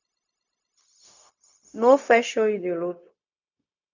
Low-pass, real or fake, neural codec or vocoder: 7.2 kHz; fake; codec, 16 kHz, 0.4 kbps, LongCat-Audio-Codec